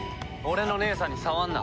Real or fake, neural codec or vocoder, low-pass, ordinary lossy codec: real; none; none; none